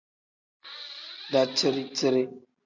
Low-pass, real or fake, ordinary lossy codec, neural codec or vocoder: 7.2 kHz; real; MP3, 48 kbps; none